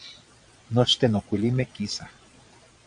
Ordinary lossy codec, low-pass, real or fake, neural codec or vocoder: AAC, 64 kbps; 9.9 kHz; fake; vocoder, 22.05 kHz, 80 mel bands, Vocos